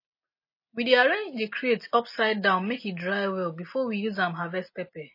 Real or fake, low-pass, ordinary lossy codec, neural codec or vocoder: real; 5.4 kHz; MP3, 24 kbps; none